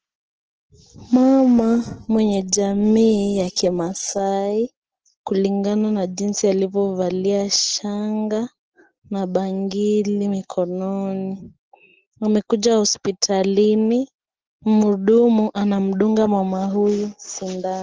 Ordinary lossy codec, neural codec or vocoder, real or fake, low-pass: Opus, 16 kbps; none; real; 7.2 kHz